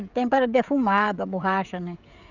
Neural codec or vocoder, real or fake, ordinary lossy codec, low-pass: codec, 16 kHz, 16 kbps, FreqCodec, smaller model; fake; none; 7.2 kHz